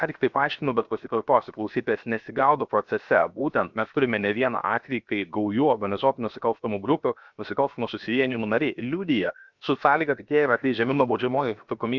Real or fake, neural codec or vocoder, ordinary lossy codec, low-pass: fake; codec, 16 kHz, 0.7 kbps, FocalCodec; Opus, 64 kbps; 7.2 kHz